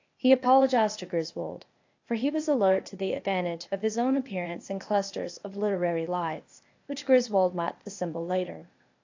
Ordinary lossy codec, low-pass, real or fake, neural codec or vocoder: AAC, 48 kbps; 7.2 kHz; fake; codec, 16 kHz, 0.8 kbps, ZipCodec